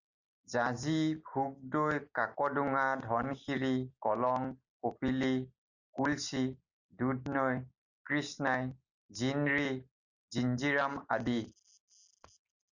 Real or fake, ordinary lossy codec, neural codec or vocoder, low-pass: real; Opus, 64 kbps; none; 7.2 kHz